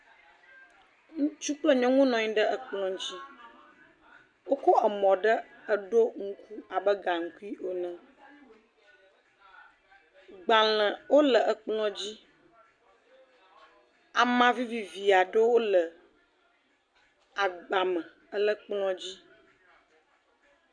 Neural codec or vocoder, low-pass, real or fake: none; 9.9 kHz; real